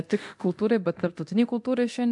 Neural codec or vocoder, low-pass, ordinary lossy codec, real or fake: codec, 24 kHz, 0.9 kbps, DualCodec; 10.8 kHz; MP3, 64 kbps; fake